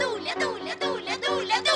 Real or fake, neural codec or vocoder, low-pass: real; none; 10.8 kHz